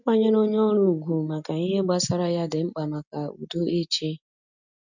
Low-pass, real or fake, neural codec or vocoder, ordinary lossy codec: 7.2 kHz; real; none; none